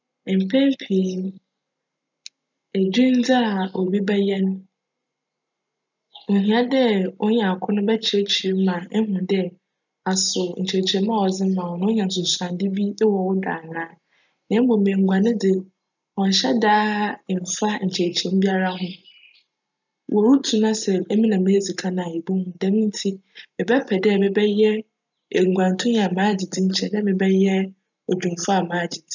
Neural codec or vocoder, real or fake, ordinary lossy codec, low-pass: none; real; none; 7.2 kHz